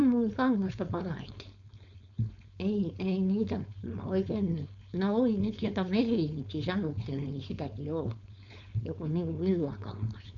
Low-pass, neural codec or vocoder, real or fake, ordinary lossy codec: 7.2 kHz; codec, 16 kHz, 4.8 kbps, FACodec; fake; none